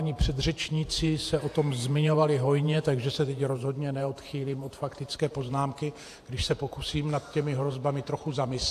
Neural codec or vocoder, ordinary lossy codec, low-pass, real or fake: vocoder, 48 kHz, 128 mel bands, Vocos; AAC, 64 kbps; 14.4 kHz; fake